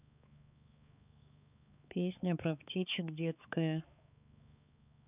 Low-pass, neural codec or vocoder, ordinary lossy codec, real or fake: 3.6 kHz; codec, 16 kHz, 4 kbps, X-Codec, HuBERT features, trained on balanced general audio; none; fake